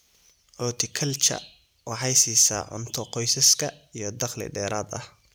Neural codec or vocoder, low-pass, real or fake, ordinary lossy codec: none; none; real; none